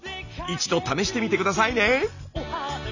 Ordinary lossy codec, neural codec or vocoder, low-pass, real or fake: none; none; 7.2 kHz; real